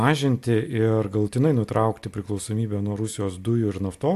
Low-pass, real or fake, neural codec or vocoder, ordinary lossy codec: 14.4 kHz; real; none; AAC, 64 kbps